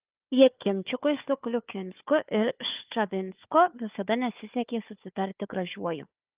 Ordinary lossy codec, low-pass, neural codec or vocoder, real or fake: Opus, 32 kbps; 3.6 kHz; codec, 16 kHz, 4 kbps, FunCodec, trained on Chinese and English, 50 frames a second; fake